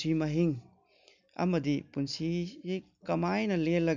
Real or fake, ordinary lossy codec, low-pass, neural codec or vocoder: real; none; 7.2 kHz; none